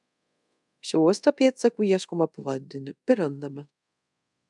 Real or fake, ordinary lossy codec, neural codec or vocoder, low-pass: fake; MP3, 96 kbps; codec, 24 kHz, 0.5 kbps, DualCodec; 10.8 kHz